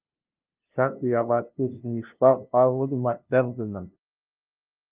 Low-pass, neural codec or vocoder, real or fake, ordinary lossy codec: 3.6 kHz; codec, 16 kHz, 0.5 kbps, FunCodec, trained on LibriTTS, 25 frames a second; fake; Opus, 24 kbps